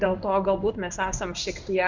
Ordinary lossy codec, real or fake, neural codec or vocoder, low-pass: Opus, 64 kbps; fake; codec, 16 kHz in and 24 kHz out, 1 kbps, XY-Tokenizer; 7.2 kHz